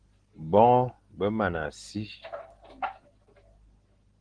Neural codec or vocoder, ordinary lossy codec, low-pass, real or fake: none; Opus, 16 kbps; 9.9 kHz; real